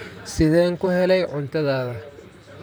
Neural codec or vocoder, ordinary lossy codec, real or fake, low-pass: vocoder, 44.1 kHz, 128 mel bands, Pupu-Vocoder; none; fake; none